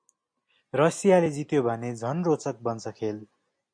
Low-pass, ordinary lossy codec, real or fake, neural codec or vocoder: 9.9 kHz; MP3, 64 kbps; real; none